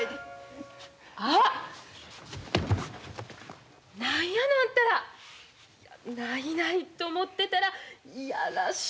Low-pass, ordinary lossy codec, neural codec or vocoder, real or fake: none; none; none; real